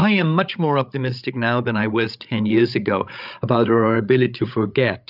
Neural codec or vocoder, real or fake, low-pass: codec, 16 kHz, 8 kbps, FreqCodec, larger model; fake; 5.4 kHz